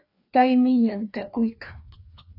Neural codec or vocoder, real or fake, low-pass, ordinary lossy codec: codec, 16 kHz, 2 kbps, FreqCodec, larger model; fake; 5.4 kHz; AAC, 32 kbps